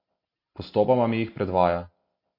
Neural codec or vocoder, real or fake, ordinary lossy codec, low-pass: none; real; MP3, 32 kbps; 5.4 kHz